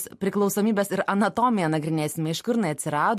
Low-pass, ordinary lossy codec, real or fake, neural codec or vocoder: 14.4 kHz; MP3, 64 kbps; real; none